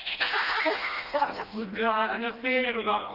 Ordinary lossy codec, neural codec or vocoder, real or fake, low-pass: Opus, 24 kbps; codec, 16 kHz, 1 kbps, FreqCodec, smaller model; fake; 5.4 kHz